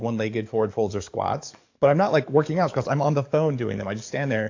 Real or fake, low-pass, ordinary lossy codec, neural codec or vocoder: fake; 7.2 kHz; AAC, 48 kbps; codec, 44.1 kHz, 7.8 kbps, DAC